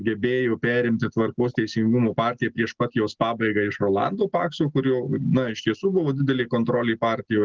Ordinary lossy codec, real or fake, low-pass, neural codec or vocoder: Opus, 16 kbps; real; 7.2 kHz; none